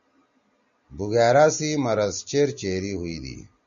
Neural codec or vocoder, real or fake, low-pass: none; real; 7.2 kHz